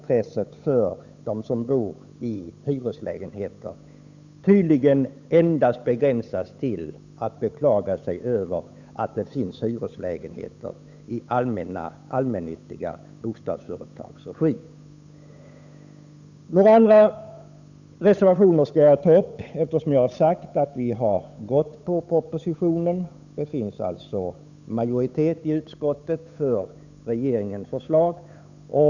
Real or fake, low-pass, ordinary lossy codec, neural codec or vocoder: fake; 7.2 kHz; none; codec, 16 kHz, 8 kbps, FunCodec, trained on Chinese and English, 25 frames a second